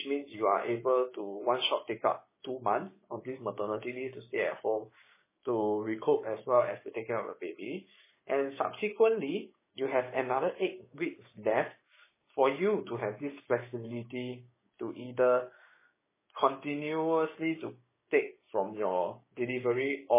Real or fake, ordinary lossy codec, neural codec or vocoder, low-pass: fake; MP3, 16 kbps; codec, 16 kHz, 6 kbps, DAC; 3.6 kHz